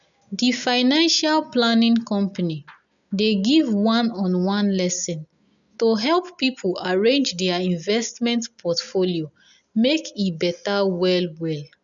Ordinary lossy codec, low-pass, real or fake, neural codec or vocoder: none; 7.2 kHz; real; none